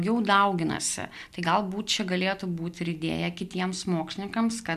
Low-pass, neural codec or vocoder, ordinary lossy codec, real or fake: 14.4 kHz; none; MP3, 96 kbps; real